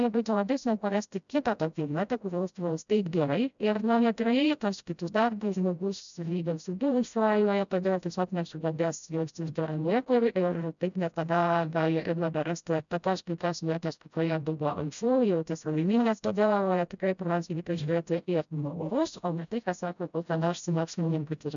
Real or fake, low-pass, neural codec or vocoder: fake; 7.2 kHz; codec, 16 kHz, 0.5 kbps, FreqCodec, smaller model